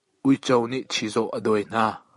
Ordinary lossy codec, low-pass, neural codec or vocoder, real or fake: MP3, 48 kbps; 14.4 kHz; none; real